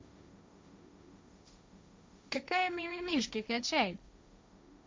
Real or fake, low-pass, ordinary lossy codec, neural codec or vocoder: fake; none; none; codec, 16 kHz, 1.1 kbps, Voila-Tokenizer